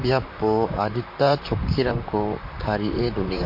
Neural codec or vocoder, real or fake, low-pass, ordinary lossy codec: vocoder, 44.1 kHz, 128 mel bands, Pupu-Vocoder; fake; 5.4 kHz; MP3, 32 kbps